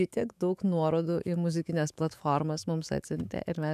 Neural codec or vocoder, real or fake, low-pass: autoencoder, 48 kHz, 128 numbers a frame, DAC-VAE, trained on Japanese speech; fake; 14.4 kHz